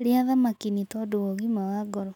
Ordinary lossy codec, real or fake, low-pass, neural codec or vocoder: none; real; 19.8 kHz; none